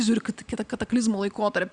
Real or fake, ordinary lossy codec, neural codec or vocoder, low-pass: real; Opus, 64 kbps; none; 9.9 kHz